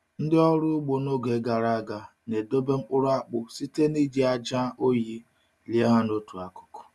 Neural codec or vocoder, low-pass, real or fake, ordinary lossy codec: none; none; real; none